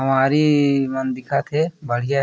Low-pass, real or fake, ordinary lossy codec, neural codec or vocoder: none; real; none; none